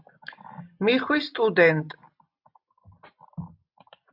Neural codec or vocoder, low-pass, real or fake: none; 5.4 kHz; real